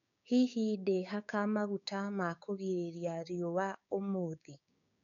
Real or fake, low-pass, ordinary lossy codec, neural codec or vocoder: fake; 7.2 kHz; none; codec, 16 kHz, 6 kbps, DAC